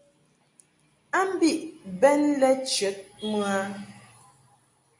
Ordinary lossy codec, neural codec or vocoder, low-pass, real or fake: AAC, 64 kbps; none; 10.8 kHz; real